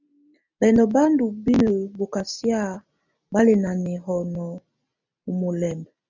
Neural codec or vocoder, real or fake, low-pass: none; real; 7.2 kHz